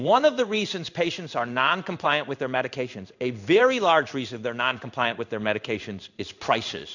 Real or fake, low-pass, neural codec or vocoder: fake; 7.2 kHz; codec, 16 kHz in and 24 kHz out, 1 kbps, XY-Tokenizer